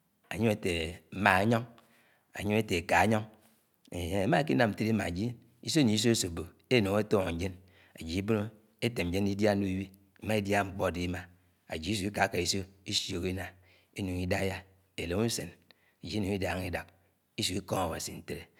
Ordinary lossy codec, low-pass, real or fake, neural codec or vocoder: none; 19.8 kHz; real; none